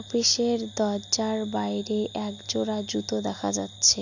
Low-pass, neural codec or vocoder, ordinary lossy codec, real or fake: 7.2 kHz; none; none; real